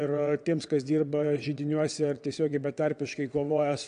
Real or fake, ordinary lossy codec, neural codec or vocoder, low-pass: fake; AAC, 96 kbps; vocoder, 22.05 kHz, 80 mel bands, WaveNeXt; 9.9 kHz